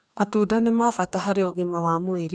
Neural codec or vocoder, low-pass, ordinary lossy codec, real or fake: codec, 44.1 kHz, 2.6 kbps, DAC; 9.9 kHz; none; fake